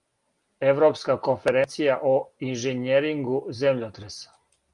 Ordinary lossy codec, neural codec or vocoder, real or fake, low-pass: Opus, 32 kbps; none; real; 10.8 kHz